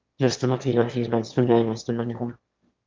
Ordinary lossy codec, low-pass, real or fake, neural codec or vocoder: Opus, 24 kbps; 7.2 kHz; fake; autoencoder, 22.05 kHz, a latent of 192 numbers a frame, VITS, trained on one speaker